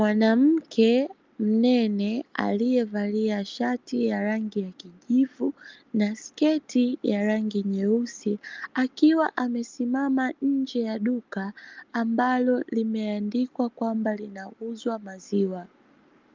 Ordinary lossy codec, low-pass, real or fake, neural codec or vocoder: Opus, 24 kbps; 7.2 kHz; real; none